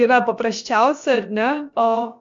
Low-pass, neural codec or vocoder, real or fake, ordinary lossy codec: 7.2 kHz; codec, 16 kHz, about 1 kbps, DyCAST, with the encoder's durations; fake; AAC, 64 kbps